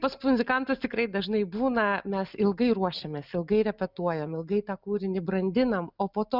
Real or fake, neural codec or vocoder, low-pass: real; none; 5.4 kHz